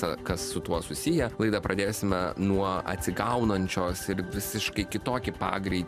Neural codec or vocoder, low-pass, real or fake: vocoder, 44.1 kHz, 128 mel bands every 512 samples, BigVGAN v2; 14.4 kHz; fake